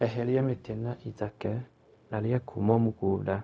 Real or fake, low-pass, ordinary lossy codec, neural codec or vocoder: fake; none; none; codec, 16 kHz, 0.4 kbps, LongCat-Audio-Codec